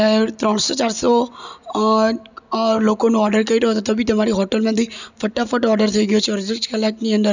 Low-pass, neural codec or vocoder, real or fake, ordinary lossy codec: 7.2 kHz; none; real; none